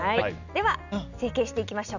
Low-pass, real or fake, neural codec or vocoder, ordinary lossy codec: 7.2 kHz; real; none; none